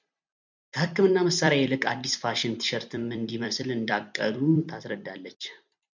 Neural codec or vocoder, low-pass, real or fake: vocoder, 44.1 kHz, 128 mel bands every 256 samples, BigVGAN v2; 7.2 kHz; fake